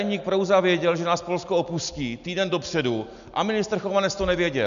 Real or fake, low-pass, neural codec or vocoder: real; 7.2 kHz; none